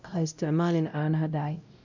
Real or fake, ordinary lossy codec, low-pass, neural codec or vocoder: fake; none; 7.2 kHz; codec, 16 kHz, 0.5 kbps, X-Codec, WavLM features, trained on Multilingual LibriSpeech